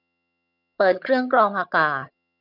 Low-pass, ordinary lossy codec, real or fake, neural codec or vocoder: 5.4 kHz; none; fake; vocoder, 22.05 kHz, 80 mel bands, HiFi-GAN